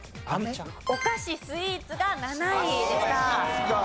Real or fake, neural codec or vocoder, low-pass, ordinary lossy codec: real; none; none; none